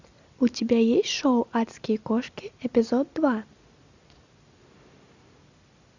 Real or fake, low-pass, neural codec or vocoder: real; 7.2 kHz; none